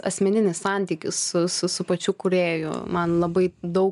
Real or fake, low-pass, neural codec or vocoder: real; 10.8 kHz; none